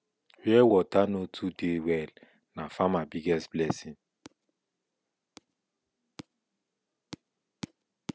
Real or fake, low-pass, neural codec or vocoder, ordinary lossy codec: real; none; none; none